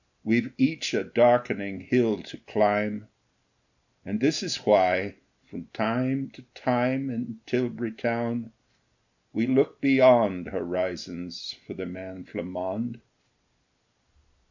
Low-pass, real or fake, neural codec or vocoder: 7.2 kHz; real; none